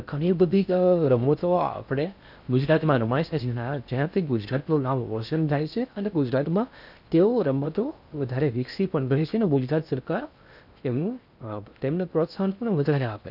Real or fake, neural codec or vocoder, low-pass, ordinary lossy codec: fake; codec, 16 kHz in and 24 kHz out, 0.6 kbps, FocalCodec, streaming, 4096 codes; 5.4 kHz; none